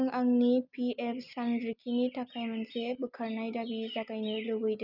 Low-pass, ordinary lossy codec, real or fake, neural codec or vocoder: 5.4 kHz; none; real; none